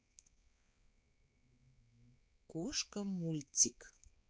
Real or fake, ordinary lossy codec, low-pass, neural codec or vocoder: fake; none; none; codec, 16 kHz, 4 kbps, X-Codec, HuBERT features, trained on balanced general audio